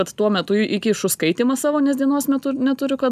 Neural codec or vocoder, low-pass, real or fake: none; 14.4 kHz; real